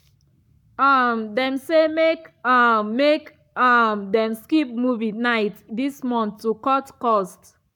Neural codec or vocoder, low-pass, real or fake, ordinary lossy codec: codec, 44.1 kHz, 7.8 kbps, Pupu-Codec; 19.8 kHz; fake; none